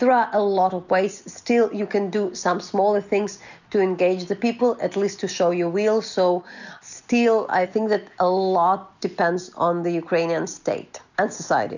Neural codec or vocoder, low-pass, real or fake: none; 7.2 kHz; real